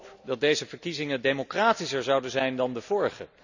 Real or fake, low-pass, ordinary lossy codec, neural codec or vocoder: real; 7.2 kHz; none; none